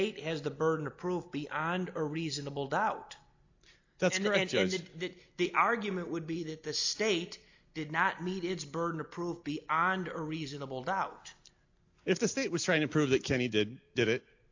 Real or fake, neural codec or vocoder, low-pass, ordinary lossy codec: real; none; 7.2 kHz; AAC, 48 kbps